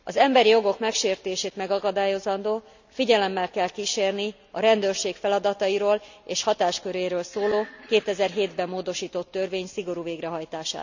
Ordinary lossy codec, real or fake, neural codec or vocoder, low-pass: none; real; none; 7.2 kHz